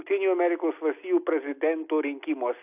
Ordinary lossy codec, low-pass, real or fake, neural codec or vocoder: AAC, 32 kbps; 3.6 kHz; real; none